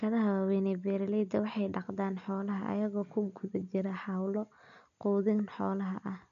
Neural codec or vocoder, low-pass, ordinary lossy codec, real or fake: none; 7.2 kHz; none; real